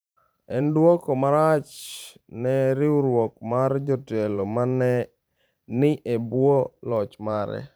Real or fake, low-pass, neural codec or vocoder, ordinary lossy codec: fake; none; vocoder, 44.1 kHz, 128 mel bands every 512 samples, BigVGAN v2; none